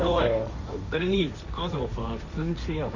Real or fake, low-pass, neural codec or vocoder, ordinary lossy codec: fake; 7.2 kHz; codec, 16 kHz, 1.1 kbps, Voila-Tokenizer; none